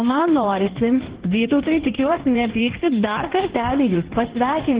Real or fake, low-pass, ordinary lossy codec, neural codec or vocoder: fake; 3.6 kHz; Opus, 16 kbps; codec, 16 kHz in and 24 kHz out, 1.1 kbps, FireRedTTS-2 codec